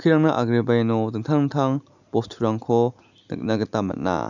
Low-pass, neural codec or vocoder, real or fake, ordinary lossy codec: 7.2 kHz; none; real; none